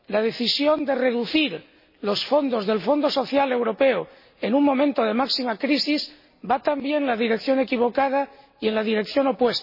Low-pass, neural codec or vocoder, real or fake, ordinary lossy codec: 5.4 kHz; none; real; MP3, 24 kbps